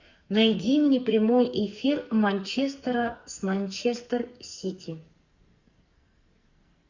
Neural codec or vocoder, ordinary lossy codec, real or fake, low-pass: codec, 44.1 kHz, 2.6 kbps, SNAC; Opus, 64 kbps; fake; 7.2 kHz